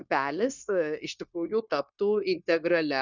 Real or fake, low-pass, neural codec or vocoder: fake; 7.2 kHz; codec, 16 kHz, 0.9 kbps, LongCat-Audio-Codec